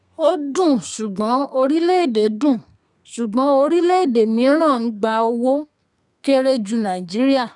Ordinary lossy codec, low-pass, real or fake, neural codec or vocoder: none; 10.8 kHz; fake; codec, 32 kHz, 1.9 kbps, SNAC